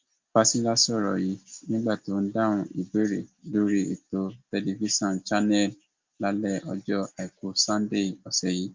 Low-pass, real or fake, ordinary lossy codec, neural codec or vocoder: 7.2 kHz; real; Opus, 32 kbps; none